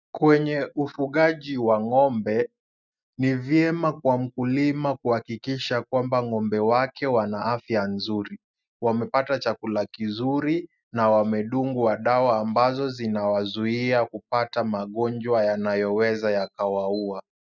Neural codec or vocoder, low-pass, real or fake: none; 7.2 kHz; real